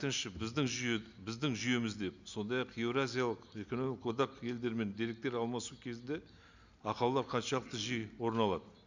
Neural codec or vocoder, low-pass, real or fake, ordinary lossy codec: none; 7.2 kHz; real; none